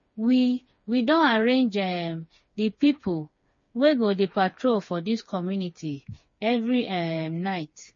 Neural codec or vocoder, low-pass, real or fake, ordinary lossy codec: codec, 16 kHz, 4 kbps, FreqCodec, smaller model; 7.2 kHz; fake; MP3, 32 kbps